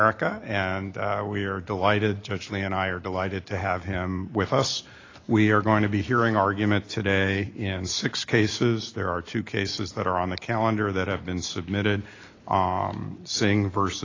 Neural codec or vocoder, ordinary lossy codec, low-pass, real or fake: none; AAC, 32 kbps; 7.2 kHz; real